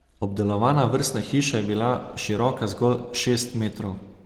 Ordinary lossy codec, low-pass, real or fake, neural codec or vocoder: Opus, 16 kbps; 19.8 kHz; real; none